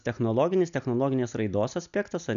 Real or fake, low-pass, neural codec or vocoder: real; 7.2 kHz; none